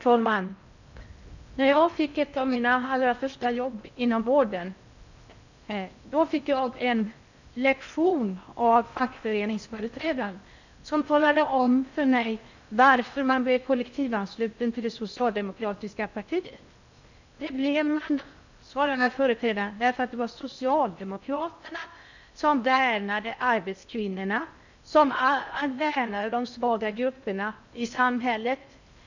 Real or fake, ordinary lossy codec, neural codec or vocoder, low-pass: fake; none; codec, 16 kHz in and 24 kHz out, 0.6 kbps, FocalCodec, streaming, 4096 codes; 7.2 kHz